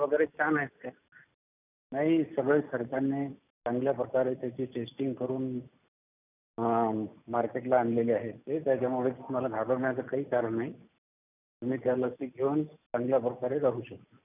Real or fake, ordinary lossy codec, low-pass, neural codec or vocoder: real; none; 3.6 kHz; none